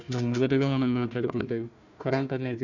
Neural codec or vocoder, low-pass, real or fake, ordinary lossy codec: codec, 16 kHz, 1 kbps, FunCodec, trained on Chinese and English, 50 frames a second; 7.2 kHz; fake; none